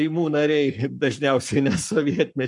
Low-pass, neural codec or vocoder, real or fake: 10.8 kHz; codec, 44.1 kHz, 7.8 kbps, Pupu-Codec; fake